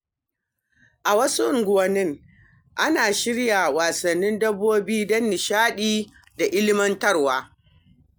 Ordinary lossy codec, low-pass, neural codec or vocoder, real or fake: none; none; none; real